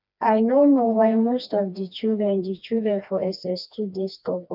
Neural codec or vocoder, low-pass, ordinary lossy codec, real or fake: codec, 16 kHz, 2 kbps, FreqCodec, smaller model; 5.4 kHz; none; fake